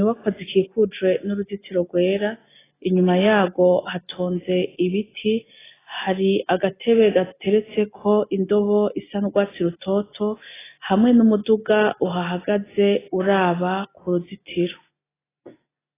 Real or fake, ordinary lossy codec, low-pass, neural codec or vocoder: real; AAC, 16 kbps; 3.6 kHz; none